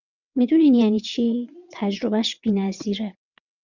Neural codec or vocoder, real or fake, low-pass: vocoder, 22.05 kHz, 80 mel bands, WaveNeXt; fake; 7.2 kHz